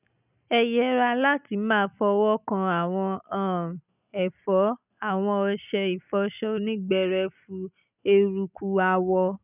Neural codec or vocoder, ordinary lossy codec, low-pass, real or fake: none; none; 3.6 kHz; real